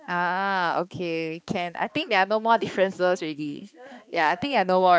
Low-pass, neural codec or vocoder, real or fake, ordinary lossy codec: none; codec, 16 kHz, 2 kbps, X-Codec, HuBERT features, trained on balanced general audio; fake; none